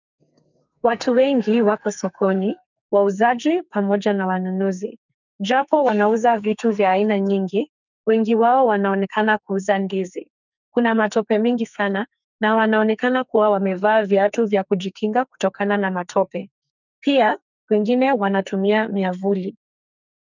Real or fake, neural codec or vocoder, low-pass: fake; codec, 44.1 kHz, 2.6 kbps, SNAC; 7.2 kHz